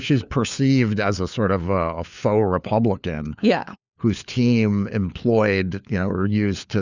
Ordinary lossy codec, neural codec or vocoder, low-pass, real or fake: Opus, 64 kbps; codec, 16 kHz, 4 kbps, FunCodec, trained on LibriTTS, 50 frames a second; 7.2 kHz; fake